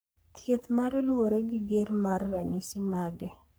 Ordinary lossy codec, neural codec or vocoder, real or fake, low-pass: none; codec, 44.1 kHz, 3.4 kbps, Pupu-Codec; fake; none